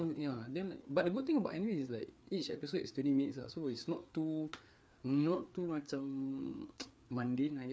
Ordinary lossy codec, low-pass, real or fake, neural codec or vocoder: none; none; fake; codec, 16 kHz, 4 kbps, FreqCodec, larger model